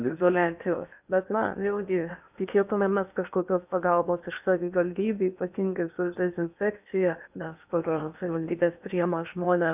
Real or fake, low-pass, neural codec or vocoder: fake; 3.6 kHz; codec, 16 kHz in and 24 kHz out, 0.8 kbps, FocalCodec, streaming, 65536 codes